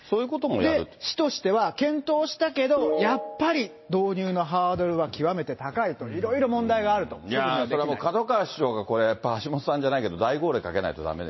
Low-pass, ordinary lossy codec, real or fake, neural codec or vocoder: 7.2 kHz; MP3, 24 kbps; real; none